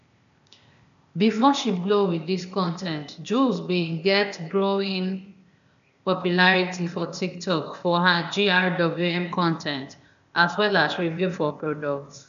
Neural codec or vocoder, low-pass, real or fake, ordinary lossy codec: codec, 16 kHz, 0.8 kbps, ZipCodec; 7.2 kHz; fake; MP3, 96 kbps